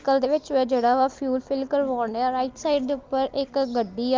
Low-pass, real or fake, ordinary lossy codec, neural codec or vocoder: 7.2 kHz; real; Opus, 32 kbps; none